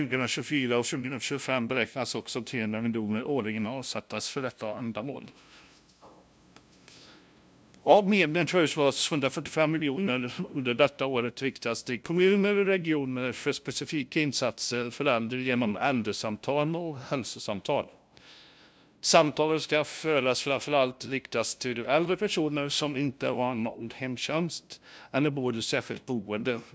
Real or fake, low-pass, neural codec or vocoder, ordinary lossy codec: fake; none; codec, 16 kHz, 0.5 kbps, FunCodec, trained on LibriTTS, 25 frames a second; none